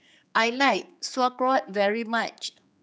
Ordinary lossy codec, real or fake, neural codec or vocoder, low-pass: none; fake; codec, 16 kHz, 4 kbps, X-Codec, HuBERT features, trained on general audio; none